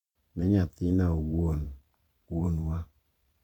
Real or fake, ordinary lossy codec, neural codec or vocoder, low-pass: fake; none; vocoder, 44.1 kHz, 128 mel bands every 512 samples, BigVGAN v2; 19.8 kHz